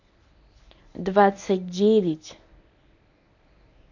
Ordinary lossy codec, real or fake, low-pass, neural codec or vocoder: none; fake; 7.2 kHz; codec, 24 kHz, 0.9 kbps, WavTokenizer, medium speech release version 1